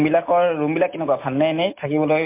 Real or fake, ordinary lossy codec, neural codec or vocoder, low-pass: real; MP3, 24 kbps; none; 3.6 kHz